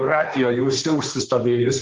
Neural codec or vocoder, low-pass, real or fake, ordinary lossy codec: codec, 16 kHz, 1.1 kbps, Voila-Tokenizer; 7.2 kHz; fake; Opus, 16 kbps